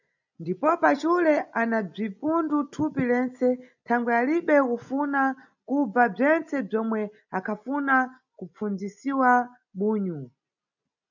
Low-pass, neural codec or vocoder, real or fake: 7.2 kHz; none; real